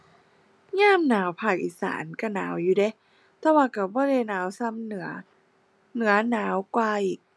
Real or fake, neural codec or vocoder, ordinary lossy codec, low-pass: real; none; none; none